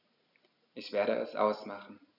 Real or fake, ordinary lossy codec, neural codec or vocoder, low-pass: real; none; none; 5.4 kHz